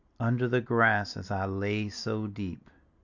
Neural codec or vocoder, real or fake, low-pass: none; real; 7.2 kHz